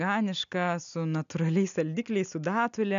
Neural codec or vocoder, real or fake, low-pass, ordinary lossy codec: none; real; 7.2 kHz; MP3, 96 kbps